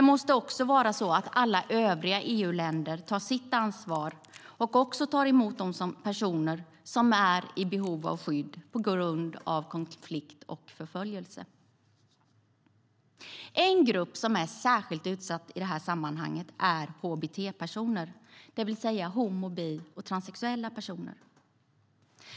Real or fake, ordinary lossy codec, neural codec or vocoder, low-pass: real; none; none; none